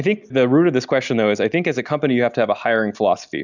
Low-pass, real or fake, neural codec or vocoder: 7.2 kHz; real; none